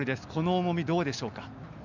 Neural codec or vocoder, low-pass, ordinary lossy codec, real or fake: none; 7.2 kHz; none; real